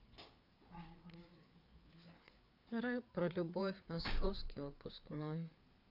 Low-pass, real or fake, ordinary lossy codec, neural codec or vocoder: 5.4 kHz; fake; none; codec, 16 kHz, 4 kbps, FreqCodec, larger model